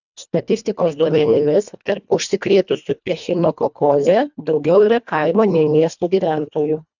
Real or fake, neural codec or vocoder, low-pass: fake; codec, 24 kHz, 1.5 kbps, HILCodec; 7.2 kHz